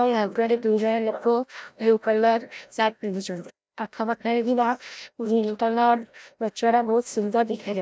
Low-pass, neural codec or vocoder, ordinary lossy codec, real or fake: none; codec, 16 kHz, 0.5 kbps, FreqCodec, larger model; none; fake